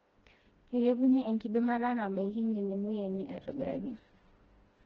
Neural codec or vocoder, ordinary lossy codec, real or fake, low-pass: codec, 16 kHz, 1 kbps, FreqCodec, smaller model; Opus, 16 kbps; fake; 7.2 kHz